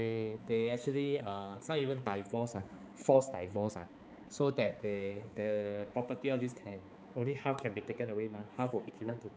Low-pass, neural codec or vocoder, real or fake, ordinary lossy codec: none; codec, 16 kHz, 4 kbps, X-Codec, HuBERT features, trained on balanced general audio; fake; none